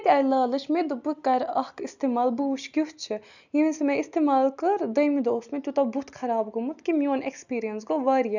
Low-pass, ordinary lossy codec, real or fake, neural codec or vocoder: 7.2 kHz; none; real; none